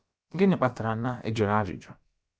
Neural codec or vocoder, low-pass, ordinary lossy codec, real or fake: codec, 16 kHz, about 1 kbps, DyCAST, with the encoder's durations; none; none; fake